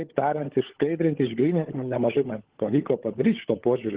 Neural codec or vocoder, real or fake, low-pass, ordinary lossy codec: codec, 16 kHz, 16 kbps, FunCodec, trained on LibriTTS, 50 frames a second; fake; 3.6 kHz; Opus, 16 kbps